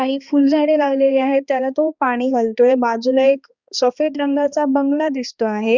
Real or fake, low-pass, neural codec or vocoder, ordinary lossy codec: fake; 7.2 kHz; codec, 16 kHz, 2 kbps, X-Codec, HuBERT features, trained on balanced general audio; Opus, 64 kbps